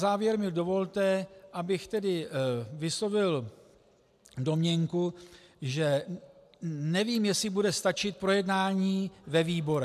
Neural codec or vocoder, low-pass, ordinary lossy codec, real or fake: none; 14.4 kHz; AAC, 96 kbps; real